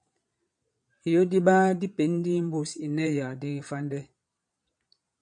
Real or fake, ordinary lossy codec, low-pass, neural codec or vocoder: fake; MP3, 96 kbps; 9.9 kHz; vocoder, 22.05 kHz, 80 mel bands, Vocos